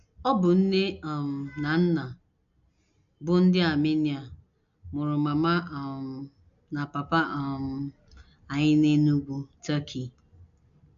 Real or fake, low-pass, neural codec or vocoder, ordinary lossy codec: real; 7.2 kHz; none; none